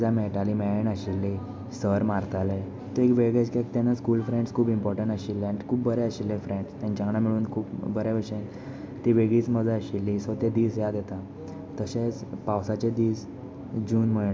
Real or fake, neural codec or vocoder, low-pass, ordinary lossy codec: real; none; none; none